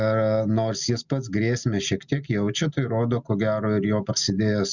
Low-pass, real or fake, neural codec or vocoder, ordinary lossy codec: 7.2 kHz; real; none; Opus, 64 kbps